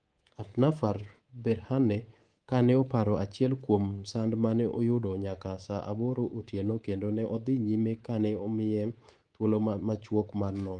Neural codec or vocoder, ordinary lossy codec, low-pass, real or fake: codec, 24 kHz, 3.1 kbps, DualCodec; Opus, 24 kbps; 9.9 kHz; fake